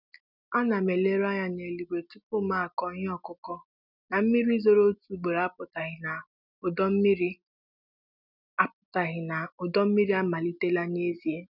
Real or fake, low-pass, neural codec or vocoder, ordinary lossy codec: real; 5.4 kHz; none; none